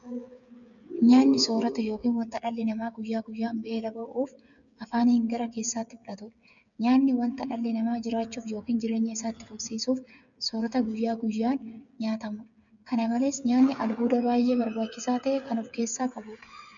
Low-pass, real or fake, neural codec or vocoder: 7.2 kHz; fake; codec, 16 kHz, 8 kbps, FreqCodec, smaller model